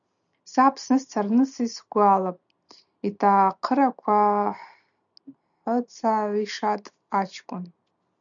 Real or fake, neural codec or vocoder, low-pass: real; none; 7.2 kHz